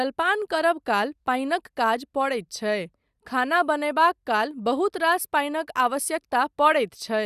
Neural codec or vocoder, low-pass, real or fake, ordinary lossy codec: none; 14.4 kHz; real; Opus, 64 kbps